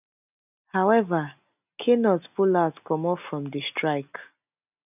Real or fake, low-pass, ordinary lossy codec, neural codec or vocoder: real; 3.6 kHz; none; none